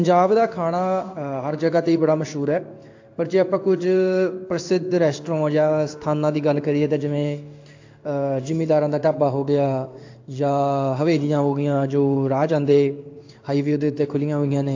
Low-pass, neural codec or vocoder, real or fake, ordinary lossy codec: 7.2 kHz; codec, 16 kHz in and 24 kHz out, 1 kbps, XY-Tokenizer; fake; AAC, 48 kbps